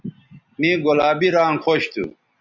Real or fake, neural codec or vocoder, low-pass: real; none; 7.2 kHz